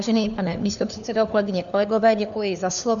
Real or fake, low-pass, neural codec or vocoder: fake; 7.2 kHz; codec, 16 kHz, 4 kbps, FunCodec, trained on LibriTTS, 50 frames a second